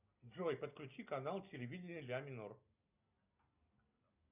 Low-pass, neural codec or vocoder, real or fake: 3.6 kHz; none; real